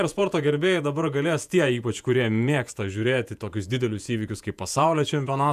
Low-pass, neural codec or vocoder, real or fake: 14.4 kHz; vocoder, 48 kHz, 128 mel bands, Vocos; fake